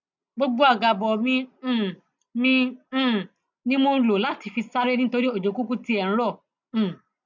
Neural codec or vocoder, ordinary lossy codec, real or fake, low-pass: none; none; real; 7.2 kHz